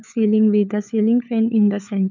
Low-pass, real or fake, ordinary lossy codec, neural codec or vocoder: 7.2 kHz; fake; none; codec, 16 kHz, 4 kbps, FunCodec, trained on LibriTTS, 50 frames a second